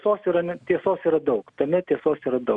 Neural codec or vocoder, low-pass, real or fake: none; 10.8 kHz; real